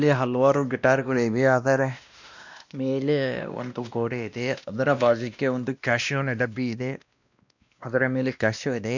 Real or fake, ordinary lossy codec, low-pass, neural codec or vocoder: fake; none; 7.2 kHz; codec, 16 kHz, 1 kbps, X-Codec, WavLM features, trained on Multilingual LibriSpeech